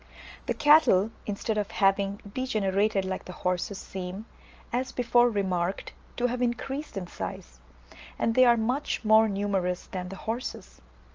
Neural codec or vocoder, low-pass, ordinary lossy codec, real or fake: none; 7.2 kHz; Opus, 24 kbps; real